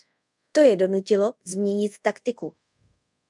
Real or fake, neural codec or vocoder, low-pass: fake; codec, 24 kHz, 0.5 kbps, DualCodec; 10.8 kHz